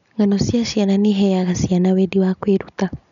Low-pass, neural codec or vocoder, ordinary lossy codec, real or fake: 7.2 kHz; none; none; real